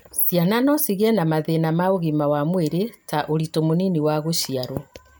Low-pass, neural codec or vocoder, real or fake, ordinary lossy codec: none; none; real; none